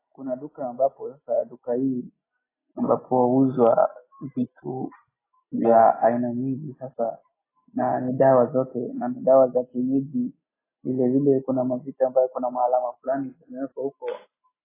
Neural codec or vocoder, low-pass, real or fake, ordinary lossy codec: none; 3.6 kHz; real; AAC, 16 kbps